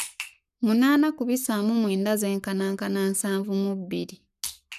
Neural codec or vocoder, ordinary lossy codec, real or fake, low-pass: codec, 44.1 kHz, 7.8 kbps, Pupu-Codec; none; fake; 14.4 kHz